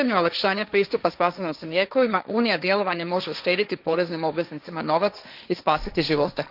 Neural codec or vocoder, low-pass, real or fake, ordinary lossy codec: codec, 16 kHz, 1.1 kbps, Voila-Tokenizer; 5.4 kHz; fake; none